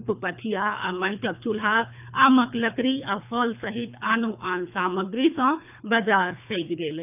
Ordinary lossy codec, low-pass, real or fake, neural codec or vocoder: none; 3.6 kHz; fake; codec, 24 kHz, 3 kbps, HILCodec